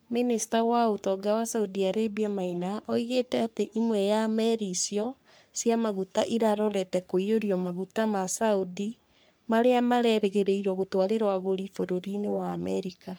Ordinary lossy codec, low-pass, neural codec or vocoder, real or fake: none; none; codec, 44.1 kHz, 3.4 kbps, Pupu-Codec; fake